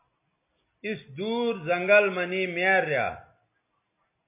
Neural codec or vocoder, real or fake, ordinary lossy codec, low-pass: none; real; MP3, 24 kbps; 3.6 kHz